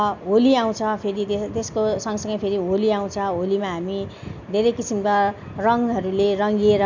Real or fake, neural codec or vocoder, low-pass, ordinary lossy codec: real; none; 7.2 kHz; MP3, 64 kbps